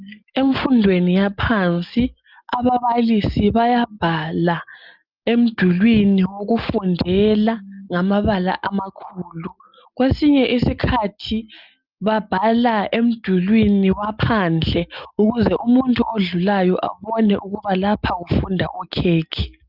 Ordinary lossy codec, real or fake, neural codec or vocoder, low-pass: Opus, 24 kbps; real; none; 5.4 kHz